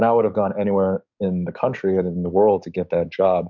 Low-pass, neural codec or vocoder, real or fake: 7.2 kHz; codec, 44.1 kHz, 7.8 kbps, DAC; fake